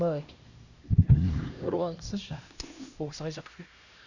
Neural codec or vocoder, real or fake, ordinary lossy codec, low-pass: codec, 16 kHz, 1 kbps, X-Codec, HuBERT features, trained on LibriSpeech; fake; none; 7.2 kHz